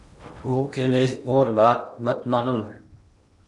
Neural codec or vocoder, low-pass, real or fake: codec, 16 kHz in and 24 kHz out, 0.8 kbps, FocalCodec, streaming, 65536 codes; 10.8 kHz; fake